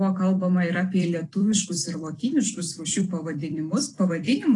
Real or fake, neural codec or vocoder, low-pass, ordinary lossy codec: fake; vocoder, 44.1 kHz, 128 mel bands every 256 samples, BigVGAN v2; 10.8 kHz; AAC, 32 kbps